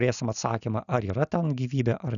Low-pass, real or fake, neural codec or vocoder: 7.2 kHz; real; none